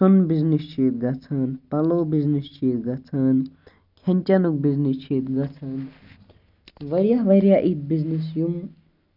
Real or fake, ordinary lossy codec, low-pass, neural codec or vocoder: real; none; 5.4 kHz; none